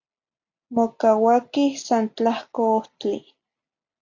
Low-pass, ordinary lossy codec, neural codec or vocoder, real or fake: 7.2 kHz; MP3, 48 kbps; none; real